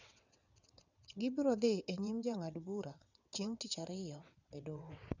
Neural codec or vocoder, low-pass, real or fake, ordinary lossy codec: vocoder, 22.05 kHz, 80 mel bands, WaveNeXt; 7.2 kHz; fake; none